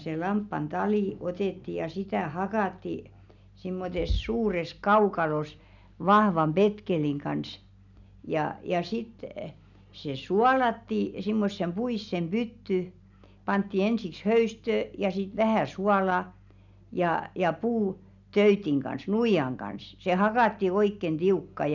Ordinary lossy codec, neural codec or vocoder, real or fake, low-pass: none; none; real; 7.2 kHz